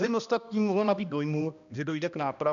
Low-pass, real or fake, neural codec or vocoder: 7.2 kHz; fake; codec, 16 kHz, 1 kbps, X-Codec, HuBERT features, trained on balanced general audio